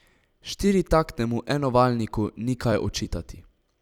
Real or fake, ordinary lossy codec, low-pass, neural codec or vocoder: fake; none; 19.8 kHz; vocoder, 44.1 kHz, 128 mel bands every 256 samples, BigVGAN v2